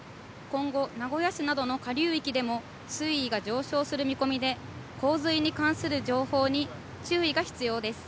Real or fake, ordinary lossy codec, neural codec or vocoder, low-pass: real; none; none; none